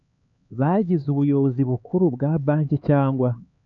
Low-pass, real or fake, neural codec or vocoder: 7.2 kHz; fake; codec, 16 kHz, 4 kbps, X-Codec, HuBERT features, trained on LibriSpeech